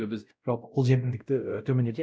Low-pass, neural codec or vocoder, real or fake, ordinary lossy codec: none; codec, 16 kHz, 0.5 kbps, X-Codec, WavLM features, trained on Multilingual LibriSpeech; fake; none